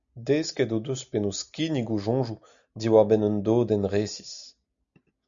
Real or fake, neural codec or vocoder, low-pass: real; none; 7.2 kHz